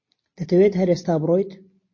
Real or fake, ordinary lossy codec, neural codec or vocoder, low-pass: real; MP3, 32 kbps; none; 7.2 kHz